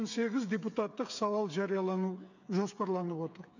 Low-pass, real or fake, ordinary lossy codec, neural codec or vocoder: 7.2 kHz; fake; none; codec, 16 kHz, 8 kbps, FreqCodec, smaller model